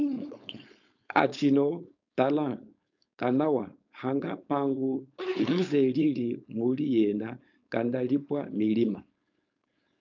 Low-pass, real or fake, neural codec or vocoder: 7.2 kHz; fake; codec, 16 kHz, 4.8 kbps, FACodec